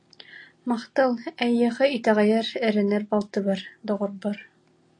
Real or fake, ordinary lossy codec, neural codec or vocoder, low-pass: real; AAC, 48 kbps; none; 10.8 kHz